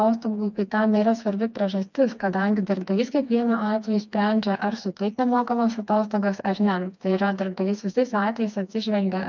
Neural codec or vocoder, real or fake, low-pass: codec, 16 kHz, 2 kbps, FreqCodec, smaller model; fake; 7.2 kHz